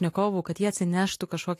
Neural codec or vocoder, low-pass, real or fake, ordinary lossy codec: none; 14.4 kHz; real; AAC, 64 kbps